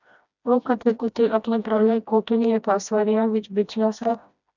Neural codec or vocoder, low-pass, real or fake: codec, 16 kHz, 1 kbps, FreqCodec, smaller model; 7.2 kHz; fake